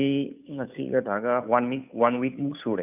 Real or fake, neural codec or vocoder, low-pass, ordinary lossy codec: fake; codec, 16 kHz, 2 kbps, FunCodec, trained on Chinese and English, 25 frames a second; 3.6 kHz; none